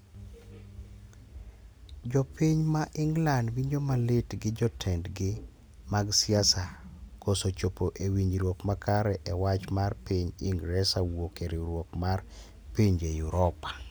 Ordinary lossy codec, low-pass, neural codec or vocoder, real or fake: none; none; none; real